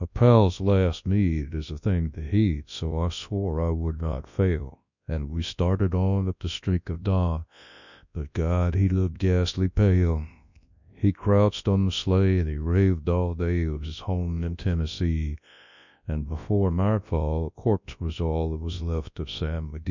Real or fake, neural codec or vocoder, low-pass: fake; codec, 24 kHz, 0.9 kbps, WavTokenizer, large speech release; 7.2 kHz